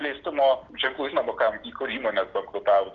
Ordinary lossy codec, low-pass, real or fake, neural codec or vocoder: Opus, 16 kbps; 7.2 kHz; real; none